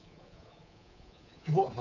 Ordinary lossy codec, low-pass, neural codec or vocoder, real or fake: AAC, 48 kbps; 7.2 kHz; codec, 24 kHz, 3.1 kbps, DualCodec; fake